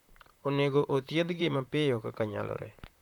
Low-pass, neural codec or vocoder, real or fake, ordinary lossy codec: 19.8 kHz; vocoder, 44.1 kHz, 128 mel bands, Pupu-Vocoder; fake; none